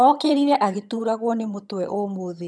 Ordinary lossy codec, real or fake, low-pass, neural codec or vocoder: none; fake; none; vocoder, 22.05 kHz, 80 mel bands, HiFi-GAN